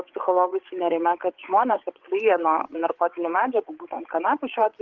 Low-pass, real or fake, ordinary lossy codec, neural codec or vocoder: 7.2 kHz; fake; Opus, 16 kbps; codec, 16 kHz, 8 kbps, FreqCodec, larger model